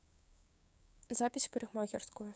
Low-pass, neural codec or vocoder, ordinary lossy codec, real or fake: none; codec, 16 kHz, 6 kbps, DAC; none; fake